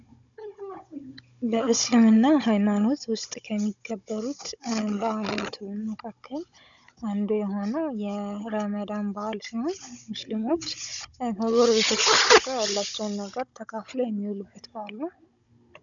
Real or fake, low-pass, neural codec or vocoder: fake; 7.2 kHz; codec, 16 kHz, 16 kbps, FunCodec, trained on Chinese and English, 50 frames a second